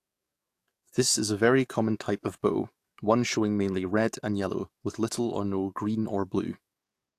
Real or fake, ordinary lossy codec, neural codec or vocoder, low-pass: fake; AAC, 64 kbps; codec, 44.1 kHz, 7.8 kbps, DAC; 14.4 kHz